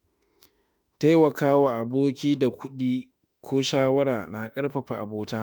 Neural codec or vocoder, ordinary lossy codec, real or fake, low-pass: autoencoder, 48 kHz, 32 numbers a frame, DAC-VAE, trained on Japanese speech; none; fake; none